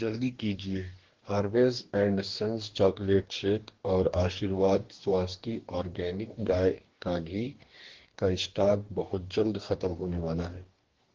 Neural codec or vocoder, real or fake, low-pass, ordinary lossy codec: codec, 44.1 kHz, 2.6 kbps, DAC; fake; 7.2 kHz; Opus, 16 kbps